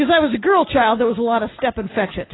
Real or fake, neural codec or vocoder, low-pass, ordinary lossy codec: real; none; 7.2 kHz; AAC, 16 kbps